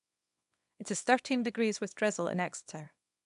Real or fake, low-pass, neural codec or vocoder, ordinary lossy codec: fake; 10.8 kHz; codec, 24 kHz, 0.9 kbps, WavTokenizer, small release; none